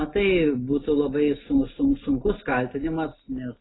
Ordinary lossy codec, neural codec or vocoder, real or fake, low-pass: AAC, 16 kbps; none; real; 7.2 kHz